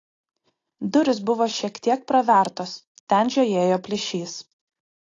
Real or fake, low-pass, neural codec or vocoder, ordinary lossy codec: real; 7.2 kHz; none; AAC, 32 kbps